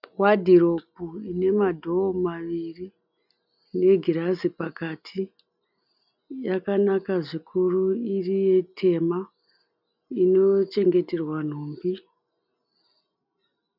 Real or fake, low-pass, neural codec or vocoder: real; 5.4 kHz; none